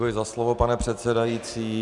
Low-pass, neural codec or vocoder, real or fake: 10.8 kHz; none; real